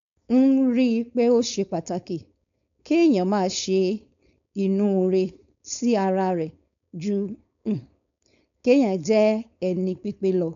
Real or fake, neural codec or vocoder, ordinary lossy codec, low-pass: fake; codec, 16 kHz, 4.8 kbps, FACodec; none; 7.2 kHz